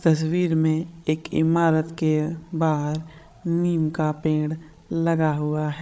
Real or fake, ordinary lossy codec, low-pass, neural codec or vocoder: fake; none; none; codec, 16 kHz, 16 kbps, FreqCodec, larger model